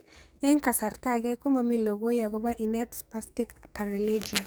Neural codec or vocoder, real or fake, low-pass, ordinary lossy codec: codec, 44.1 kHz, 2.6 kbps, SNAC; fake; none; none